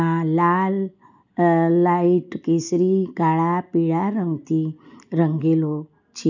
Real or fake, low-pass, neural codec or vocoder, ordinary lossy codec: real; 7.2 kHz; none; none